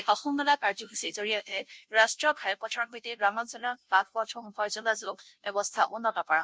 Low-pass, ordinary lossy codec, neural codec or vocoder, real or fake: none; none; codec, 16 kHz, 0.5 kbps, FunCodec, trained on Chinese and English, 25 frames a second; fake